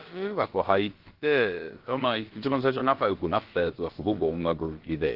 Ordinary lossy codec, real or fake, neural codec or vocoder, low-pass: Opus, 16 kbps; fake; codec, 16 kHz, about 1 kbps, DyCAST, with the encoder's durations; 5.4 kHz